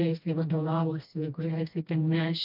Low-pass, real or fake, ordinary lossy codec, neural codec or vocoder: 5.4 kHz; fake; MP3, 48 kbps; codec, 16 kHz, 1 kbps, FreqCodec, smaller model